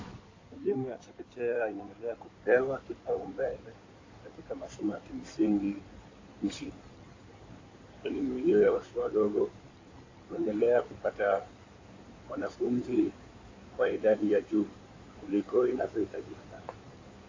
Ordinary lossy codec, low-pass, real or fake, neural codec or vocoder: AAC, 32 kbps; 7.2 kHz; fake; codec, 16 kHz in and 24 kHz out, 2.2 kbps, FireRedTTS-2 codec